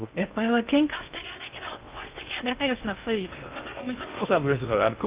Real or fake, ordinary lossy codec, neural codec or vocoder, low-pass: fake; Opus, 32 kbps; codec, 16 kHz in and 24 kHz out, 0.6 kbps, FocalCodec, streaming, 2048 codes; 3.6 kHz